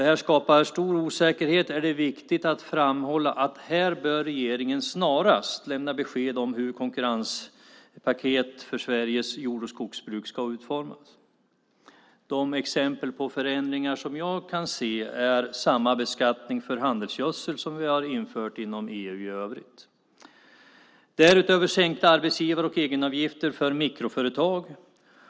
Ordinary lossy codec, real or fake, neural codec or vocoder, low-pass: none; real; none; none